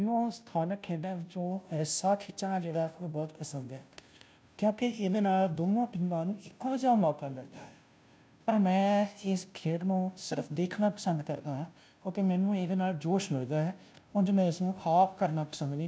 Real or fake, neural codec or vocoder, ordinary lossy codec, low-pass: fake; codec, 16 kHz, 0.5 kbps, FunCodec, trained on Chinese and English, 25 frames a second; none; none